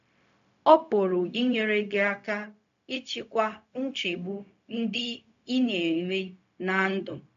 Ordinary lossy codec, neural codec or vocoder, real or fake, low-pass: AAC, 64 kbps; codec, 16 kHz, 0.4 kbps, LongCat-Audio-Codec; fake; 7.2 kHz